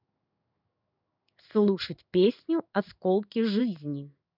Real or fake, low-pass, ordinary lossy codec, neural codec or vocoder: fake; 5.4 kHz; none; codec, 16 kHz in and 24 kHz out, 1 kbps, XY-Tokenizer